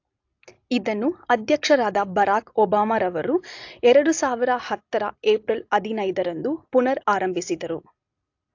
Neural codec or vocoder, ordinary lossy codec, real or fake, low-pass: none; AAC, 48 kbps; real; 7.2 kHz